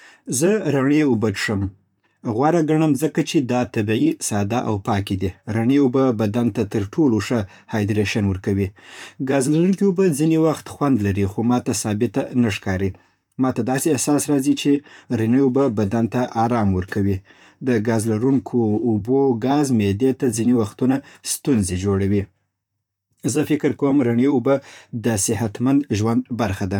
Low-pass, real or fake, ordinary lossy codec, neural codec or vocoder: 19.8 kHz; fake; none; vocoder, 44.1 kHz, 128 mel bands, Pupu-Vocoder